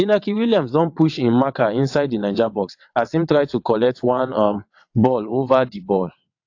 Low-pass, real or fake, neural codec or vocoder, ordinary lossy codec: 7.2 kHz; fake; vocoder, 22.05 kHz, 80 mel bands, WaveNeXt; AAC, 48 kbps